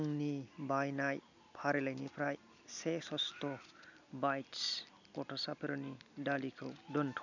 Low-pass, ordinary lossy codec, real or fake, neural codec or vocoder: 7.2 kHz; none; real; none